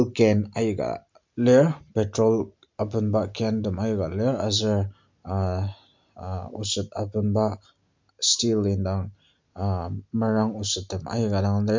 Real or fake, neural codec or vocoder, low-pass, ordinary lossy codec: real; none; 7.2 kHz; MP3, 64 kbps